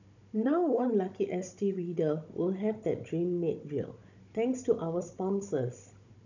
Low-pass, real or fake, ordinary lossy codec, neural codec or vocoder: 7.2 kHz; fake; none; codec, 16 kHz, 16 kbps, FunCodec, trained on Chinese and English, 50 frames a second